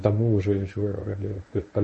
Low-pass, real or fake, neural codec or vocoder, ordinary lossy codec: 10.8 kHz; fake; codec, 24 kHz, 0.9 kbps, WavTokenizer, medium speech release version 1; MP3, 32 kbps